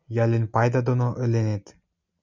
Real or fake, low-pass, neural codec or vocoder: real; 7.2 kHz; none